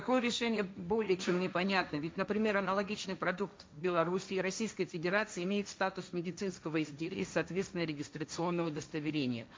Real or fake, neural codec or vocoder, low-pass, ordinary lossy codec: fake; codec, 16 kHz, 1.1 kbps, Voila-Tokenizer; none; none